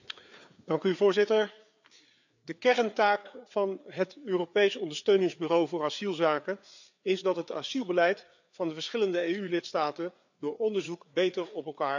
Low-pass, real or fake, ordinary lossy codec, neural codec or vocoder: 7.2 kHz; fake; none; codec, 16 kHz, 4 kbps, FreqCodec, larger model